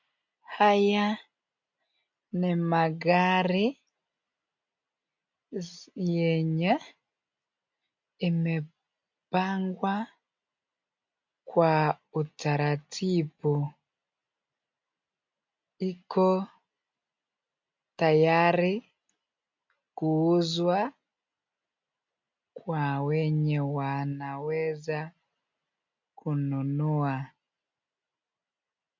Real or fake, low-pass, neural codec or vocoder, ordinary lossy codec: real; 7.2 kHz; none; MP3, 48 kbps